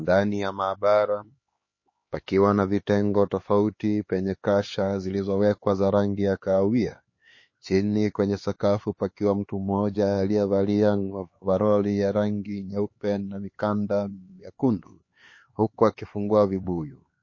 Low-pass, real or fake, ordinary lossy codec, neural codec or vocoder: 7.2 kHz; fake; MP3, 32 kbps; codec, 16 kHz, 4 kbps, X-Codec, HuBERT features, trained on LibriSpeech